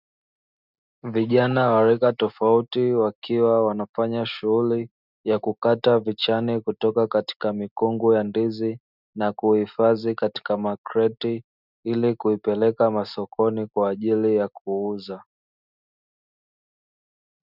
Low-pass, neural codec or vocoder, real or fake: 5.4 kHz; none; real